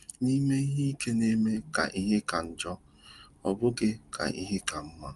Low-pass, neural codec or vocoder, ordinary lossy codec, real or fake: 10.8 kHz; none; Opus, 32 kbps; real